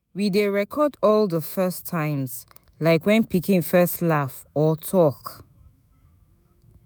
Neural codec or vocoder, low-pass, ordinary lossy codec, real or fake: autoencoder, 48 kHz, 128 numbers a frame, DAC-VAE, trained on Japanese speech; none; none; fake